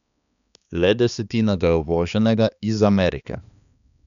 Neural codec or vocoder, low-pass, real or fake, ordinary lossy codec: codec, 16 kHz, 2 kbps, X-Codec, HuBERT features, trained on balanced general audio; 7.2 kHz; fake; none